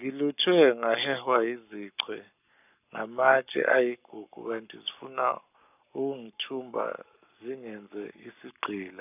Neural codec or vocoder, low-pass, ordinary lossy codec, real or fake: codec, 44.1 kHz, 7.8 kbps, Pupu-Codec; 3.6 kHz; none; fake